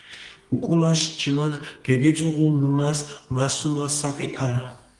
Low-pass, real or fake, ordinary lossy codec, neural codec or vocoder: 10.8 kHz; fake; Opus, 24 kbps; codec, 24 kHz, 0.9 kbps, WavTokenizer, medium music audio release